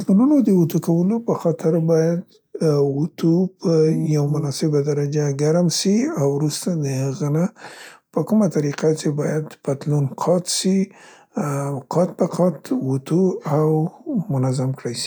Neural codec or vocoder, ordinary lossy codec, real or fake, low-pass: none; none; real; none